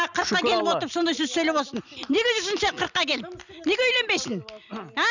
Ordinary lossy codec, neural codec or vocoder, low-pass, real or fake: none; none; 7.2 kHz; real